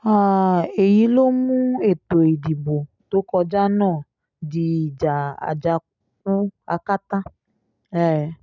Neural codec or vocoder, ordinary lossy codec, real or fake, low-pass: none; none; real; 7.2 kHz